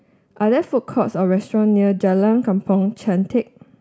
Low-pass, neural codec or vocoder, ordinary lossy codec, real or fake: none; none; none; real